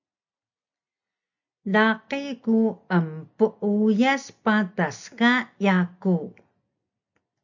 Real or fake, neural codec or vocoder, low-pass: real; none; 7.2 kHz